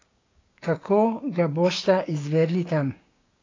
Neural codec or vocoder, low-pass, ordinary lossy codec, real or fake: codec, 16 kHz, 6 kbps, DAC; 7.2 kHz; AAC, 32 kbps; fake